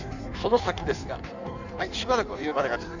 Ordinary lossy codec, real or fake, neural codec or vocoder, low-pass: none; fake; codec, 16 kHz in and 24 kHz out, 1.1 kbps, FireRedTTS-2 codec; 7.2 kHz